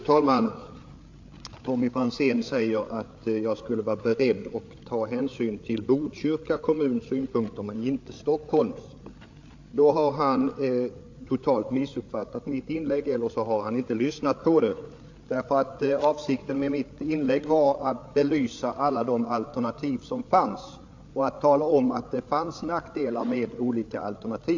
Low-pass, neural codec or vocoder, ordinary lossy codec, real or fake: 7.2 kHz; codec, 16 kHz, 8 kbps, FreqCodec, larger model; AAC, 48 kbps; fake